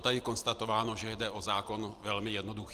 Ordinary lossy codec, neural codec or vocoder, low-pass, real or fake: Opus, 24 kbps; none; 14.4 kHz; real